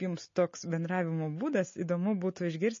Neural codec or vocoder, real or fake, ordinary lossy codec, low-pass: none; real; MP3, 32 kbps; 7.2 kHz